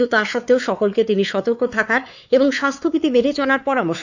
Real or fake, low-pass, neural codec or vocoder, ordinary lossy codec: fake; 7.2 kHz; codec, 16 kHz, 4 kbps, X-Codec, WavLM features, trained on Multilingual LibriSpeech; none